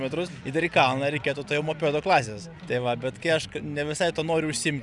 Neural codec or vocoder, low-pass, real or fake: none; 10.8 kHz; real